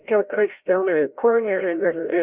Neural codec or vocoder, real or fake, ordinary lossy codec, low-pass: codec, 16 kHz, 0.5 kbps, FreqCodec, larger model; fake; Opus, 64 kbps; 3.6 kHz